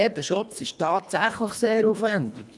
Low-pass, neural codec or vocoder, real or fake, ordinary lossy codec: none; codec, 24 kHz, 1.5 kbps, HILCodec; fake; none